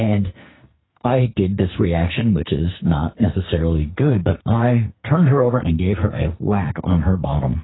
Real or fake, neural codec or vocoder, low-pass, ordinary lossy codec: fake; codec, 44.1 kHz, 2.6 kbps, SNAC; 7.2 kHz; AAC, 16 kbps